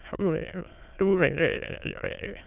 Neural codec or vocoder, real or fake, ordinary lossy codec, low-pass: autoencoder, 22.05 kHz, a latent of 192 numbers a frame, VITS, trained on many speakers; fake; none; 3.6 kHz